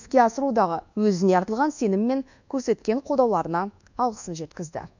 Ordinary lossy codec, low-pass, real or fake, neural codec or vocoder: none; 7.2 kHz; fake; codec, 24 kHz, 1.2 kbps, DualCodec